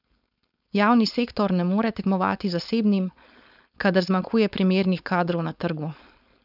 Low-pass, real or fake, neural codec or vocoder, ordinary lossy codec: 5.4 kHz; fake; codec, 16 kHz, 4.8 kbps, FACodec; none